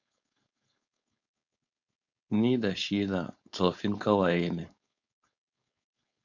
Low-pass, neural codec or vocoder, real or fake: 7.2 kHz; codec, 16 kHz, 4.8 kbps, FACodec; fake